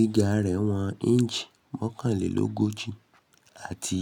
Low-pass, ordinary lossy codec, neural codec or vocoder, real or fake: 19.8 kHz; none; none; real